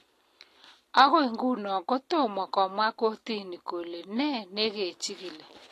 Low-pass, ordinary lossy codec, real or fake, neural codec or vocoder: 14.4 kHz; AAC, 48 kbps; real; none